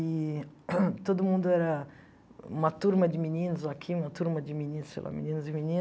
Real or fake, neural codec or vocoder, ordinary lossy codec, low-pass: real; none; none; none